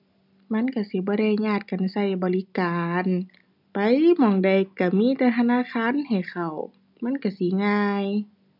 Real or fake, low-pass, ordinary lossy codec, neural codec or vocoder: real; 5.4 kHz; none; none